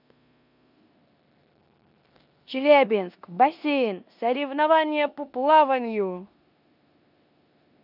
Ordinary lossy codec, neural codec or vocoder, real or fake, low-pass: none; codec, 16 kHz in and 24 kHz out, 0.9 kbps, LongCat-Audio-Codec, four codebook decoder; fake; 5.4 kHz